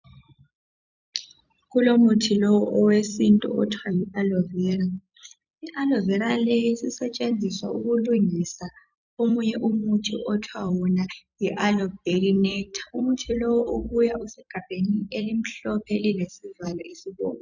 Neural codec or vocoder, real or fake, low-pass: vocoder, 44.1 kHz, 128 mel bands every 256 samples, BigVGAN v2; fake; 7.2 kHz